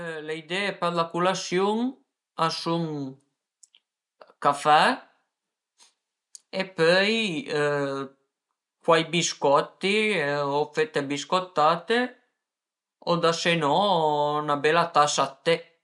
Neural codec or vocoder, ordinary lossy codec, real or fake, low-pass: none; MP3, 96 kbps; real; 10.8 kHz